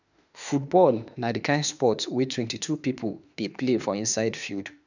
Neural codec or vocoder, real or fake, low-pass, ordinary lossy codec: autoencoder, 48 kHz, 32 numbers a frame, DAC-VAE, trained on Japanese speech; fake; 7.2 kHz; none